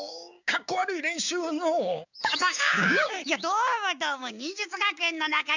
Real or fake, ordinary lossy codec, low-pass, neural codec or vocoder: fake; none; 7.2 kHz; codec, 16 kHz, 6 kbps, DAC